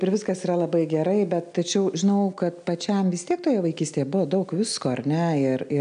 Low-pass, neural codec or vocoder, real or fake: 9.9 kHz; none; real